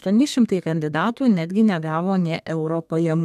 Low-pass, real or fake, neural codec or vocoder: 14.4 kHz; fake; codec, 32 kHz, 1.9 kbps, SNAC